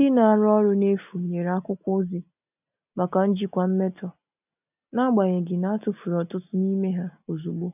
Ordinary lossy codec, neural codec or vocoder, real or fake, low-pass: none; none; real; 3.6 kHz